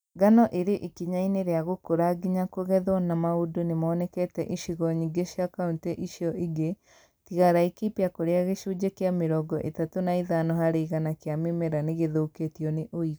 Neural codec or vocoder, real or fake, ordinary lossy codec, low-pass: none; real; none; none